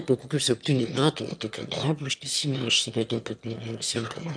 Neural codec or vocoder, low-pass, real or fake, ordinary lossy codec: autoencoder, 22.05 kHz, a latent of 192 numbers a frame, VITS, trained on one speaker; 9.9 kHz; fake; MP3, 96 kbps